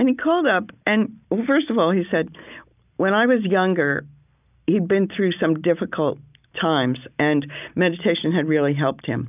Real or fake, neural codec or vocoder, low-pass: real; none; 3.6 kHz